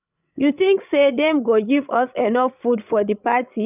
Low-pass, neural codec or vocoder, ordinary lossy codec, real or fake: 3.6 kHz; codec, 16 kHz, 16 kbps, FreqCodec, larger model; none; fake